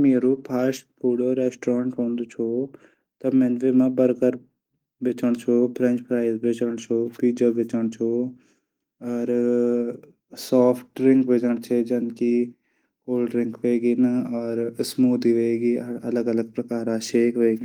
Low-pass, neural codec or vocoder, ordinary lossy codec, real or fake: 19.8 kHz; none; Opus, 24 kbps; real